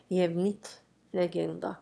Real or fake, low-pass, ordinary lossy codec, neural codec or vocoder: fake; none; none; autoencoder, 22.05 kHz, a latent of 192 numbers a frame, VITS, trained on one speaker